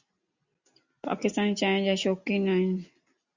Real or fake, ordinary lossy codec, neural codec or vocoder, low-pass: real; Opus, 64 kbps; none; 7.2 kHz